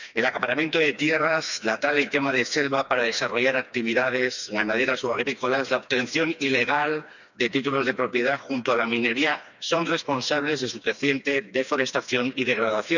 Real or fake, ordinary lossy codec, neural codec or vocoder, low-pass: fake; none; codec, 16 kHz, 2 kbps, FreqCodec, smaller model; 7.2 kHz